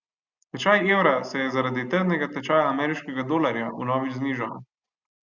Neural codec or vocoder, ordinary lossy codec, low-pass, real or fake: none; Opus, 64 kbps; 7.2 kHz; real